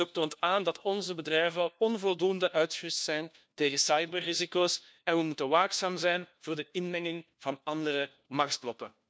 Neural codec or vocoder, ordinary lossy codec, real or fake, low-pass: codec, 16 kHz, 1 kbps, FunCodec, trained on LibriTTS, 50 frames a second; none; fake; none